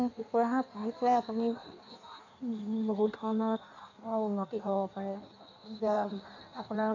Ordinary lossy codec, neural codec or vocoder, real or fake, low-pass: none; codec, 16 kHz in and 24 kHz out, 1.1 kbps, FireRedTTS-2 codec; fake; 7.2 kHz